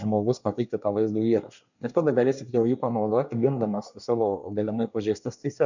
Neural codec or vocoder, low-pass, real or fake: codec, 24 kHz, 1 kbps, SNAC; 7.2 kHz; fake